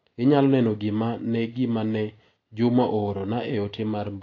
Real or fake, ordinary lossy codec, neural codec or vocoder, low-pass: real; AAC, 32 kbps; none; 7.2 kHz